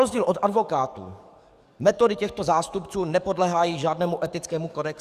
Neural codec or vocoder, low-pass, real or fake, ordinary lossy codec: codec, 44.1 kHz, 7.8 kbps, DAC; 14.4 kHz; fake; Opus, 64 kbps